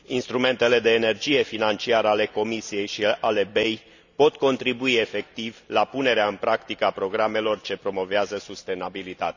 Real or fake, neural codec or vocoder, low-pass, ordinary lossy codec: real; none; 7.2 kHz; none